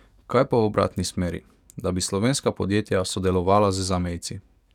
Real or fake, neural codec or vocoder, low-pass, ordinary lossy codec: fake; codec, 44.1 kHz, 7.8 kbps, DAC; 19.8 kHz; none